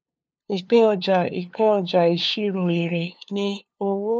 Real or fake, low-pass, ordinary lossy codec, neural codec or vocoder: fake; none; none; codec, 16 kHz, 2 kbps, FunCodec, trained on LibriTTS, 25 frames a second